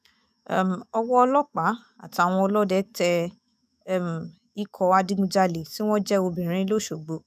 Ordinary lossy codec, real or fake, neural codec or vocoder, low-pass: none; fake; autoencoder, 48 kHz, 128 numbers a frame, DAC-VAE, trained on Japanese speech; 14.4 kHz